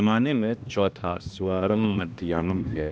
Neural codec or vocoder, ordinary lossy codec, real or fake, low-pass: codec, 16 kHz, 1 kbps, X-Codec, HuBERT features, trained on balanced general audio; none; fake; none